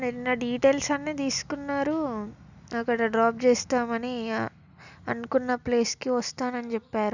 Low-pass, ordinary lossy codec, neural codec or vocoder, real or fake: 7.2 kHz; none; none; real